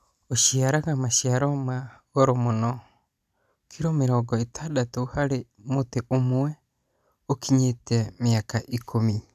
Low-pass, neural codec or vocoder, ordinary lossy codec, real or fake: 14.4 kHz; none; none; real